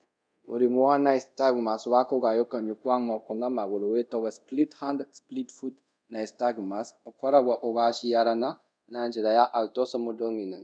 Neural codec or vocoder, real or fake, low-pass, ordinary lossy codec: codec, 24 kHz, 0.5 kbps, DualCodec; fake; 9.9 kHz; none